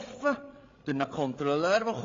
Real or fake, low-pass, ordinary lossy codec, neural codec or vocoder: fake; 7.2 kHz; AAC, 32 kbps; codec, 16 kHz, 8 kbps, FreqCodec, larger model